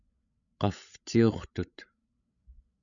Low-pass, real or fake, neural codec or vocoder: 7.2 kHz; fake; codec, 16 kHz, 16 kbps, FreqCodec, larger model